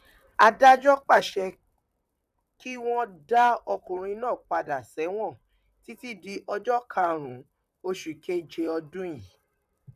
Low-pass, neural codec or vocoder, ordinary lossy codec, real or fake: 14.4 kHz; vocoder, 44.1 kHz, 128 mel bands, Pupu-Vocoder; none; fake